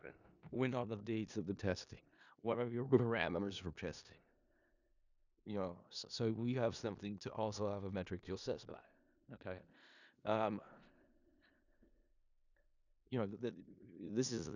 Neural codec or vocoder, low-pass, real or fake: codec, 16 kHz in and 24 kHz out, 0.4 kbps, LongCat-Audio-Codec, four codebook decoder; 7.2 kHz; fake